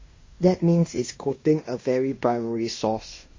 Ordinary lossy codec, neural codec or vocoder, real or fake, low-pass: MP3, 32 kbps; codec, 16 kHz in and 24 kHz out, 0.9 kbps, LongCat-Audio-Codec, fine tuned four codebook decoder; fake; 7.2 kHz